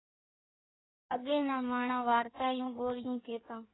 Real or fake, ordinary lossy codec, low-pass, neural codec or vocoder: fake; AAC, 16 kbps; 7.2 kHz; codec, 16 kHz in and 24 kHz out, 1.1 kbps, FireRedTTS-2 codec